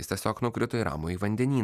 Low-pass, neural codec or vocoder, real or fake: 14.4 kHz; none; real